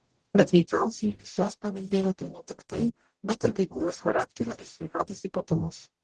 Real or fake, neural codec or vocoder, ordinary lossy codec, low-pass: fake; codec, 44.1 kHz, 0.9 kbps, DAC; Opus, 16 kbps; 10.8 kHz